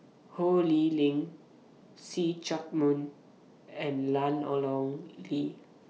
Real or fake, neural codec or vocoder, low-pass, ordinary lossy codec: real; none; none; none